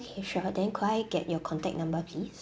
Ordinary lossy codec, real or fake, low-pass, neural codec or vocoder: none; real; none; none